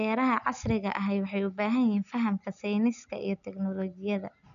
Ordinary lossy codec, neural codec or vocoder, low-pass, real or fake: none; none; 7.2 kHz; real